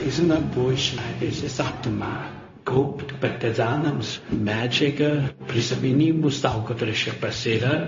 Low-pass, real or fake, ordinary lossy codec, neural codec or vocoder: 7.2 kHz; fake; MP3, 32 kbps; codec, 16 kHz, 0.4 kbps, LongCat-Audio-Codec